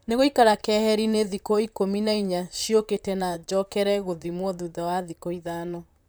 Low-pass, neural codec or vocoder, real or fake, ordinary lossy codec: none; vocoder, 44.1 kHz, 128 mel bands, Pupu-Vocoder; fake; none